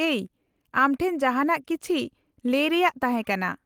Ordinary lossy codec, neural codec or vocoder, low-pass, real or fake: Opus, 24 kbps; none; 19.8 kHz; real